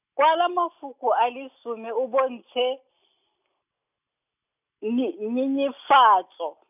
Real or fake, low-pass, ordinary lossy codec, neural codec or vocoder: real; 3.6 kHz; none; none